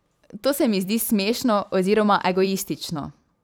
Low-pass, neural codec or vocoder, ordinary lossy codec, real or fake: none; vocoder, 44.1 kHz, 128 mel bands every 512 samples, BigVGAN v2; none; fake